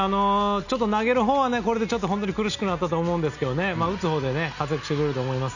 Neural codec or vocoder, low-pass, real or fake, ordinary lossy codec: none; 7.2 kHz; real; none